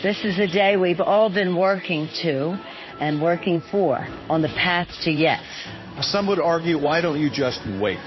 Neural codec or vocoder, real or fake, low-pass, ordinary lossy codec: codec, 16 kHz in and 24 kHz out, 1 kbps, XY-Tokenizer; fake; 7.2 kHz; MP3, 24 kbps